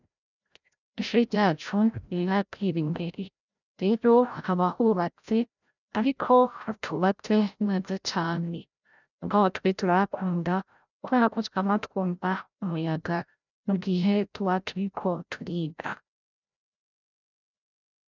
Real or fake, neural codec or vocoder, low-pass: fake; codec, 16 kHz, 0.5 kbps, FreqCodec, larger model; 7.2 kHz